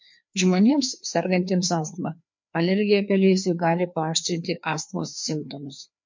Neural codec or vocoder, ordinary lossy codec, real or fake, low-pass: codec, 16 kHz, 2 kbps, FreqCodec, larger model; MP3, 48 kbps; fake; 7.2 kHz